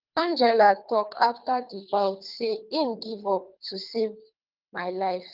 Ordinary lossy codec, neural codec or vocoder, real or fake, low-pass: Opus, 32 kbps; codec, 24 kHz, 3 kbps, HILCodec; fake; 5.4 kHz